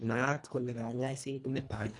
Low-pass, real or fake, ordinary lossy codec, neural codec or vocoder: 10.8 kHz; fake; none; codec, 24 kHz, 1.5 kbps, HILCodec